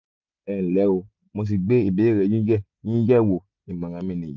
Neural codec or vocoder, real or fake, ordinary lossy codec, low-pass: none; real; none; 7.2 kHz